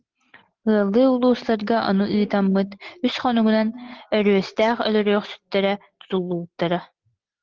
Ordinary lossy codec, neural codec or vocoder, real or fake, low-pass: Opus, 16 kbps; none; real; 7.2 kHz